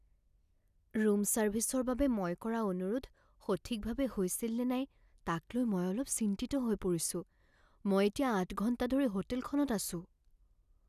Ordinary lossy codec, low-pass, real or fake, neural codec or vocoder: none; 14.4 kHz; real; none